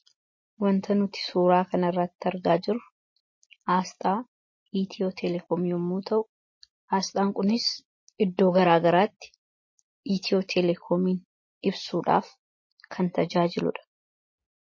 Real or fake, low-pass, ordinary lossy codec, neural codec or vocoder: real; 7.2 kHz; MP3, 32 kbps; none